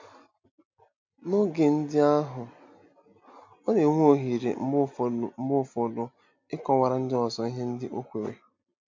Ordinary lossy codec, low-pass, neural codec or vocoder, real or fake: MP3, 48 kbps; 7.2 kHz; none; real